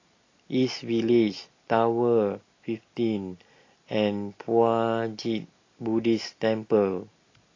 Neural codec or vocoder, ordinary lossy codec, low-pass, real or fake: none; AAC, 32 kbps; 7.2 kHz; real